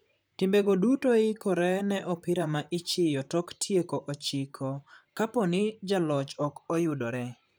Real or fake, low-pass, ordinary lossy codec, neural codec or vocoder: fake; none; none; vocoder, 44.1 kHz, 128 mel bands, Pupu-Vocoder